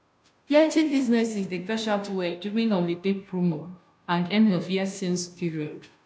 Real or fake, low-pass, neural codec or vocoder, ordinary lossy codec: fake; none; codec, 16 kHz, 0.5 kbps, FunCodec, trained on Chinese and English, 25 frames a second; none